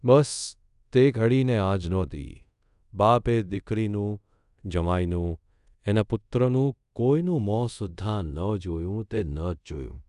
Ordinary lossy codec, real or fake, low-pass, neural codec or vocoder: none; fake; 10.8 kHz; codec, 24 kHz, 0.5 kbps, DualCodec